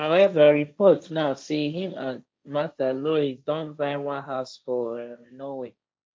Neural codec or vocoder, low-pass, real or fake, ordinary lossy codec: codec, 16 kHz, 1.1 kbps, Voila-Tokenizer; none; fake; none